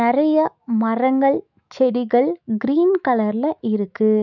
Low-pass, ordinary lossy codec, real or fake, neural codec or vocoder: 7.2 kHz; none; fake; autoencoder, 48 kHz, 128 numbers a frame, DAC-VAE, trained on Japanese speech